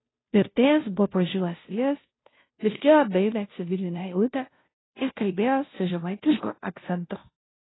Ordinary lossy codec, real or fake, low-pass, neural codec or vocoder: AAC, 16 kbps; fake; 7.2 kHz; codec, 16 kHz, 0.5 kbps, FunCodec, trained on Chinese and English, 25 frames a second